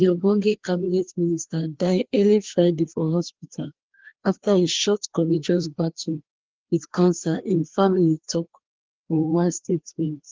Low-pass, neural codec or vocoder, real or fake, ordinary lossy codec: 7.2 kHz; codec, 16 kHz, 2 kbps, FreqCodec, larger model; fake; Opus, 16 kbps